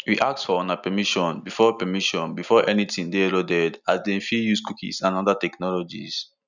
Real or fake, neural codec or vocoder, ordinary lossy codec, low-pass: real; none; none; 7.2 kHz